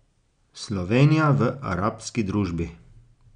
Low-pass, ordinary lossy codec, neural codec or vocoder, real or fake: 9.9 kHz; none; none; real